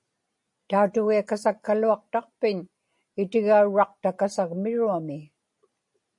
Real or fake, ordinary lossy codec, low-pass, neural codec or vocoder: real; MP3, 48 kbps; 10.8 kHz; none